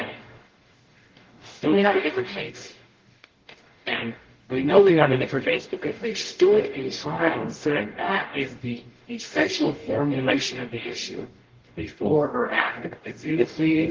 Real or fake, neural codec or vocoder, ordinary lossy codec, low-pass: fake; codec, 44.1 kHz, 0.9 kbps, DAC; Opus, 16 kbps; 7.2 kHz